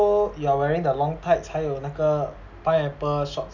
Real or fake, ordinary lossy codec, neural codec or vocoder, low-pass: real; none; none; 7.2 kHz